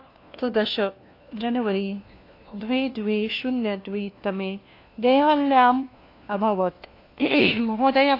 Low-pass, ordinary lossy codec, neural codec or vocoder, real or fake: 5.4 kHz; AAC, 32 kbps; codec, 16 kHz, 1 kbps, FunCodec, trained on LibriTTS, 50 frames a second; fake